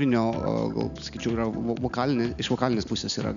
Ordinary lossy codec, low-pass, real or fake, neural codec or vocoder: MP3, 96 kbps; 7.2 kHz; real; none